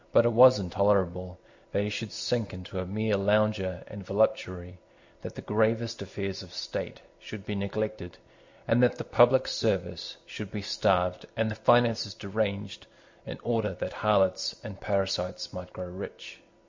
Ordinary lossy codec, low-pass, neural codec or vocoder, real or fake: MP3, 64 kbps; 7.2 kHz; none; real